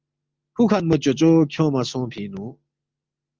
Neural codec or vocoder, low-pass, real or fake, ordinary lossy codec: none; 7.2 kHz; real; Opus, 32 kbps